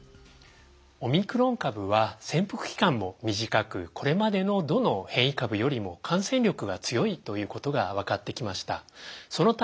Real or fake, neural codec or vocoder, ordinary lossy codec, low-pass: real; none; none; none